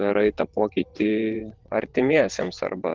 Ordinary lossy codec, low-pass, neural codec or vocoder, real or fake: Opus, 16 kbps; 7.2 kHz; vocoder, 22.05 kHz, 80 mel bands, WaveNeXt; fake